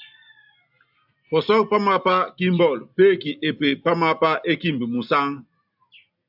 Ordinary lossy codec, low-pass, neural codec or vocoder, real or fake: AAC, 48 kbps; 5.4 kHz; none; real